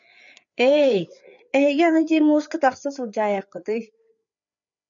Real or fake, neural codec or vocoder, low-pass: fake; codec, 16 kHz, 4 kbps, FreqCodec, larger model; 7.2 kHz